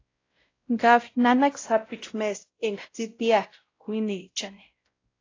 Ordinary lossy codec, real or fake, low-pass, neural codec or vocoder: AAC, 32 kbps; fake; 7.2 kHz; codec, 16 kHz, 0.5 kbps, X-Codec, WavLM features, trained on Multilingual LibriSpeech